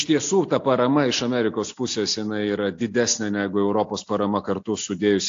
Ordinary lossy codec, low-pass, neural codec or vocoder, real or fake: AAC, 48 kbps; 7.2 kHz; none; real